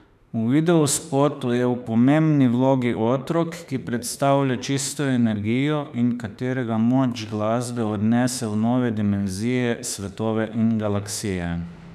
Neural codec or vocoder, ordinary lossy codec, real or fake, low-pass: autoencoder, 48 kHz, 32 numbers a frame, DAC-VAE, trained on Japanese speech; none; fake; 14.4 kHz